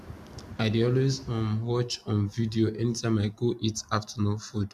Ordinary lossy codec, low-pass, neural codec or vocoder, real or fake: none; 14.4 kHz; none; real